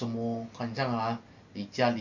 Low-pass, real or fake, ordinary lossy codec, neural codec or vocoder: 7.2 kHz; real; none; none